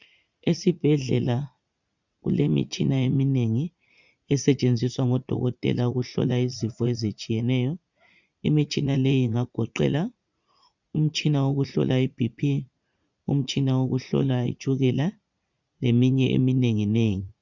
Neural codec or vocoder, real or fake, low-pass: vocoder, 44.1 kHz, 80 mel bands, Vocos; fake; 7.2 kHz